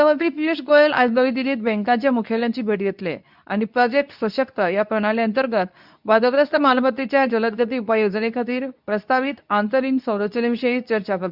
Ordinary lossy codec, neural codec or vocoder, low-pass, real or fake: none; codec, 24 kHz, 0.9 kbps, WavTokenizer, medium speech release version 2; 5.4 kHz; fake